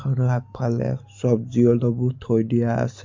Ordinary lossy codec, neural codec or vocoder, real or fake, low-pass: MP3, 48 kbps; none; real; 7.2 kHz